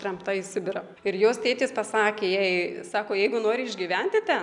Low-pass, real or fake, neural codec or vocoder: 10.8 kHz; real; none